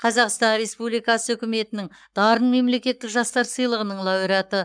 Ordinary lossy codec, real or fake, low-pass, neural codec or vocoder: none; fake; 9.9 kHz; codec, 44.1 kHz, 7.8 kbps, Pupu-Codec